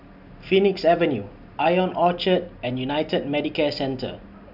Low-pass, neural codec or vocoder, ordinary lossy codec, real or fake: 5.4 kHz; none; none; real